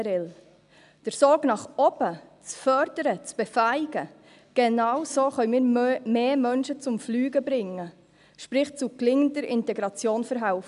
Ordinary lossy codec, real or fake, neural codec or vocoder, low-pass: none; real; none; 10.8 kHz